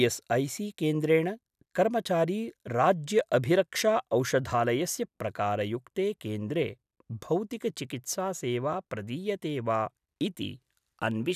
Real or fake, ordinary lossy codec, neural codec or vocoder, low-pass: real; none; none; 14.4 kHz